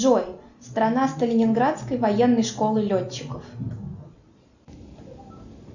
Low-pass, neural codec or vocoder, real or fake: 7.2 kHz; none; real